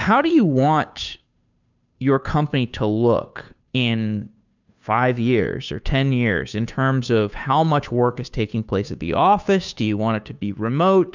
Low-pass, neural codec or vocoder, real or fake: 7.2 kHz; codec, 16 kHz, 2 kbps, FunCodec, trained on Chinese and English, 25 frames a second; fake